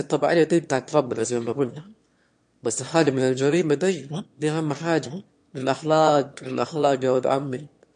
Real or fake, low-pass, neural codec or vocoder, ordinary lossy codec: fake; 9.9 kHz; autoencoder, 22.05 kHz, a latent of 192 numbers a frame, VITS, trained on one speaker; MP3, 48 kbps